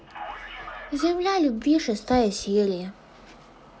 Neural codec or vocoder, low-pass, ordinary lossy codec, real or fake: none; none; none; real